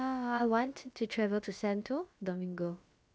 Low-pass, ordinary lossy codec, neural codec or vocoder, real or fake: none; none; codec, 16 kHz, about 1 kbps, DyCAST, with the encoder's durations; fake